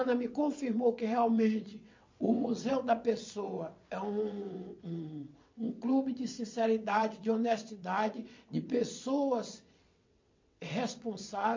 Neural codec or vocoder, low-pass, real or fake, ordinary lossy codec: vocoder, 22.05 kHz, 80 mel bands, WaveNeXt; 7.2 kHz; fake; MP3, 48 kbps